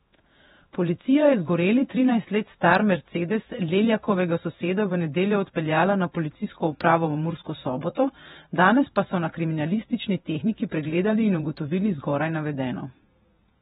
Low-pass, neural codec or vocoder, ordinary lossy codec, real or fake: 19.8 kHz; vocoder, 48 kHz, 128 mel bands, Vocos; AAC, 16 kbps; fake